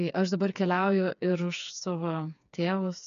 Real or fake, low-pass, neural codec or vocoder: fake; 7.2 kHz; codec, 16 kHz, 4 kbps, FreqCodec, smaller model